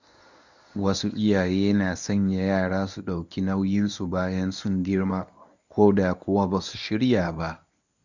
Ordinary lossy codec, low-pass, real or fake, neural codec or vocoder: none; 7.2 kHz; fake; codec, 24 kHz, 0.9 kbps, WavTokenizer, medium speech release version 1